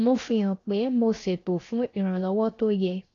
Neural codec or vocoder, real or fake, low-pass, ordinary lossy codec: codec, 16 kHz, 0.7 kbps, FocalCodec; fake; 7.2 kHz; AAC, 32 kbps